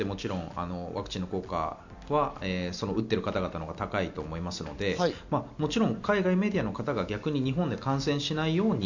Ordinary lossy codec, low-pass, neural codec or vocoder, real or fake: none; 7.2 kHz; none; real